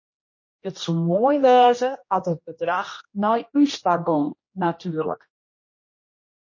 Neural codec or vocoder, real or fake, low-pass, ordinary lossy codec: codec, 16 kHz, 1 kbps, X-Codec, HuBERT features, trained on general audio; fake; 7.2 kHz; MP3, 32 kbps